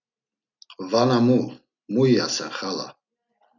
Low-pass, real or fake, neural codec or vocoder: 7.2 kHz; real; none